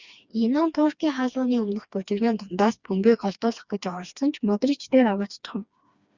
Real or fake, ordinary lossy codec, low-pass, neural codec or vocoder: fake; Opus, 64 kbps; 7.2 kHz; codec, 16 kHz, 2 kbps, FreqCodec, smaller model